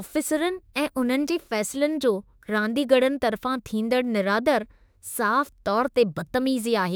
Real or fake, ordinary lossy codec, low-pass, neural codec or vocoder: fake; none; none; autoencoder, 48 kHz, 32 numbers a frame, DAC-VAE, trained on Japanese speech